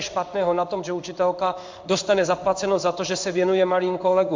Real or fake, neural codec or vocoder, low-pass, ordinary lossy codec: fake; codec, 16 kHz in and 24 kHz out, 1 kbps, XY-Tokenizer; 7.2 kHz; MP3, 64 kbps